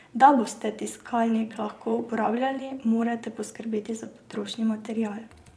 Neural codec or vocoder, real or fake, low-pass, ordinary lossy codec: vocoder, 22.05 kHz, 80 mel bands, Vocos; fake; none; none